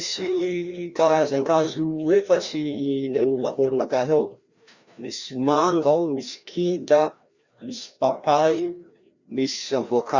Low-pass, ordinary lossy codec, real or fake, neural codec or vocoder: 7.2 kHz; Opus, 64 kbps; fake; codec, 16 kHz, 1 kbps, FreqCodec, larger model